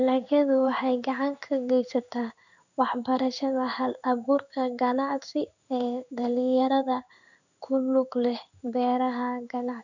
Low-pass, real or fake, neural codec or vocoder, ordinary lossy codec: 7.2 kHz; fake; codec, 16 kHz in and 24 kHz out, 1 kbps, XY-Tokenizer; none